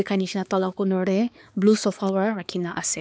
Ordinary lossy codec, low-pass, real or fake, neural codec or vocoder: none; none; fake; codec, 16 kHz, 4 kbps, X-Codec, HuBERT features, trained on balanced general audio